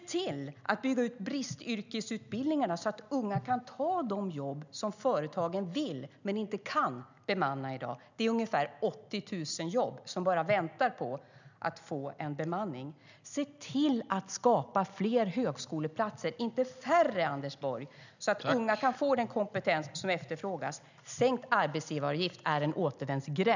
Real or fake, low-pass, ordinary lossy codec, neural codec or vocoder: real; 7.2 kHz; none; none